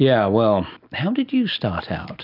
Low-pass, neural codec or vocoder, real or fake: 5.4 kHz; none; real